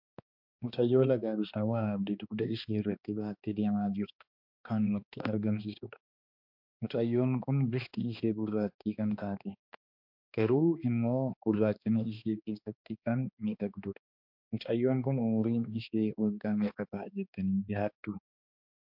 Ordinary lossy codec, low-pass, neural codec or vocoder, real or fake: MP3, 48 kbps; 5.4 kHz; codec, 16 kHz, 2 kbps, X-Codec, HuBERT features, trained on balanced general audio; fake